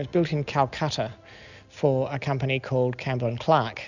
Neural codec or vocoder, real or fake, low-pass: none; real; 7.2 kHz